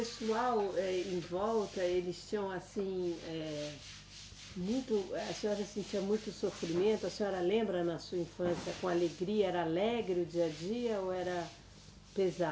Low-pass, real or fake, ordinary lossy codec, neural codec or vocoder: none; real; none; none